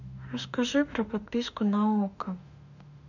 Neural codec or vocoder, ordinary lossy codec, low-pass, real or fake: autoencoder, 48 kHz, 32 numbers a frame, DAC-VAE, trained on Japanese speech; none; 7.2 kHz; fake